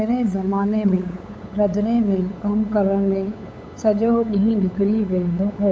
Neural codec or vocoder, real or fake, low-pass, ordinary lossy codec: codec, 16 kHz, 8 kbps, FunCodec, trained on LibriTTS, 25 frames a second; fake; none; none